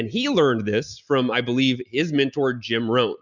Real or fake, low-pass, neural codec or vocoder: real; 7.2 kHz; none